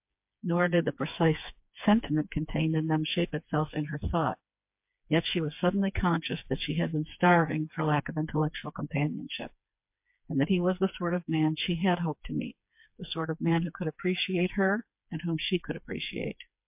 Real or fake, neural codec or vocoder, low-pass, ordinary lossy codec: fake; codec, 16 kHz, 4 kbps, FreqCodec, smaller model; 3.6 kHz; MP3, 32 kbps